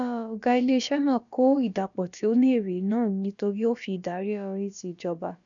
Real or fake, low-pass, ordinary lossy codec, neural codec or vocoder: fake; 7.2 kHz; none; codec, 16 kHz, about 1 kbps, DyCAST, with the encoder's durations